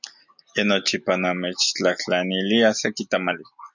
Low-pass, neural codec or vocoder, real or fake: 7.2 kHz; none; real